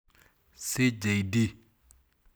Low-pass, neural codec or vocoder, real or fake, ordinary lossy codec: none; none; real; none